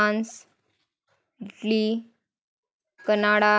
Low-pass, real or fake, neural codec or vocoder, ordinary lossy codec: none; real; none; none